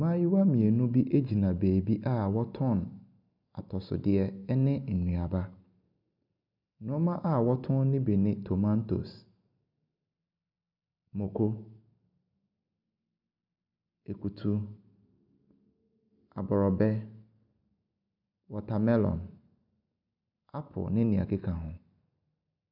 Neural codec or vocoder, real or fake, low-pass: none; real; 5.4 kHz